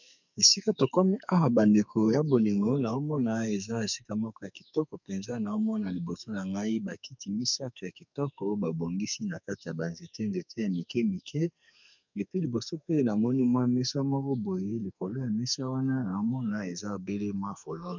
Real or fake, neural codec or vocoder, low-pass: fake; codec, 44.1 kHz, 2.6 kbps, SNAC; 7.2 kHz